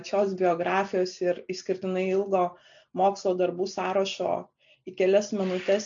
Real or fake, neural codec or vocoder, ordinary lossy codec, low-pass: real; none; MP3, 64 kbps; 7.2 kHz